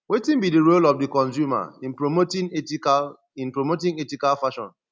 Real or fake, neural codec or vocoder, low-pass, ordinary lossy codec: real; none; none; none